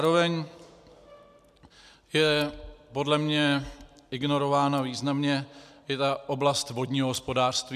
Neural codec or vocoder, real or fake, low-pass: none; real; 14.4 kHz